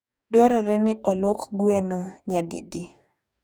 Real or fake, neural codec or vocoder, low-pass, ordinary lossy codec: fake; codec, 44.1 kHz, 2.6 kbps, DAC; none; none